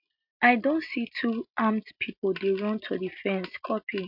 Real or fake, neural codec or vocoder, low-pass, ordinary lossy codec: real; none; 5.4 kHz; MP3, 48 kbps